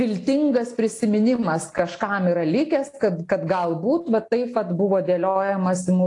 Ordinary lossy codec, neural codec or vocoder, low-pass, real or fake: AAC, 48 kbps; none; 10.8 kHz; real